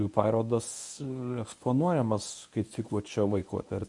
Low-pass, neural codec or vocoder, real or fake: 10.8 kHz; codec, 24 kHz, 0.9 kbps, WavTokenizer, medium speech release version 1; fake